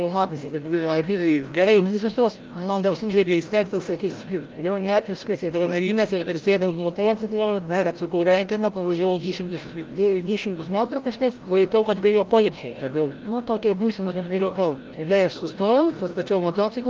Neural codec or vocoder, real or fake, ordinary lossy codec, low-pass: codec, 16 kHz, 0.5 kbps, FreqCodec, larger model; fake; Opus, 32 kbps; 7.2 kHz